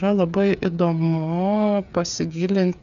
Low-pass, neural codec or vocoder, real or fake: 7.2 kHz; codec, 16 kHz, 8 kbps, FreqCodec, smaller model; fake